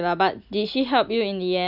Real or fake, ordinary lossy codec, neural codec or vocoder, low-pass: real; none; none; 5.4 kHz